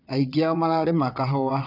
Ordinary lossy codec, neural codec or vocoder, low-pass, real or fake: none; vocoder, 22.05 kHz, 80 mel bands, WaveNeXt; 5.4 kHz; fake